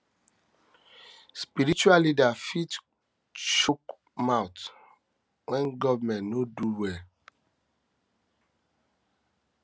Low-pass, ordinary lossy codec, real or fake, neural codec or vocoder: none; none; real; none